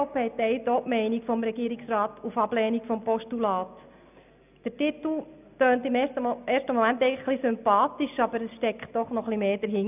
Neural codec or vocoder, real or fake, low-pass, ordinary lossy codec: none; real; 3.6 kHz; none